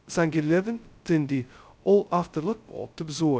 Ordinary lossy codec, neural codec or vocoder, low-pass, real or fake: none; codec, 16 kHz, 0.2 kbps, FocalCodec; none; fake